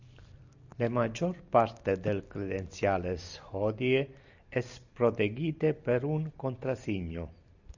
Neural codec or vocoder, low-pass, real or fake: none; 7.2 kHz; real